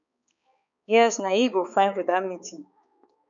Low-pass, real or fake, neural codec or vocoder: 7.2 kHz; fake; codec, 16 kHz, 4 kbps, X-Codec, HuBERT features, trained on balanced general audio